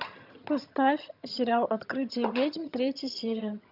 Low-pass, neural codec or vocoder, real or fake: 5.4 kHz; vocoder, 22.05 kHz, 80 mel bands, HiFi-GAN; fake